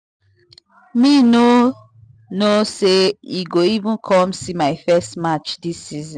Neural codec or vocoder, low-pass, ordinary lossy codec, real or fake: none; none; none; real